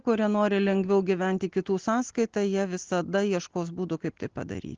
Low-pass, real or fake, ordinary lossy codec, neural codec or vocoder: 7.2 kHz; real; Opus, 16 kbps; none